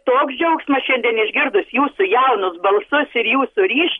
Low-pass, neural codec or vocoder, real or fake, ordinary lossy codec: 19.8 kHz; none; real; MP3, 48 kbps